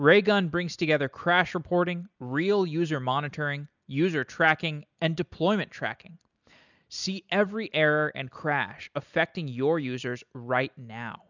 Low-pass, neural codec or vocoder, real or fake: 7.2 kHz; none; real